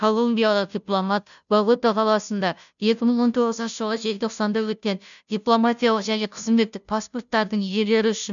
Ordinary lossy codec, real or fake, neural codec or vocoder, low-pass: none; fake; codec, 16 kHz, 0.5 kbps, FunCodec, trained on Chinese and English, 25 frames a second; 7.2 kHz